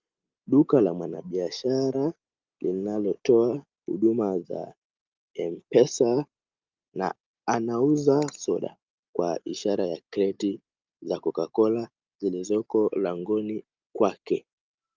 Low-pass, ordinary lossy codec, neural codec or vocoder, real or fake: 7.2 kHz; Opus, 24 kbps; none; real